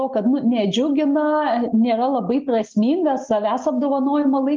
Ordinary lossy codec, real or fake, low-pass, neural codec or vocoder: Opus, 32 kbps; real; 7.2 kHz; none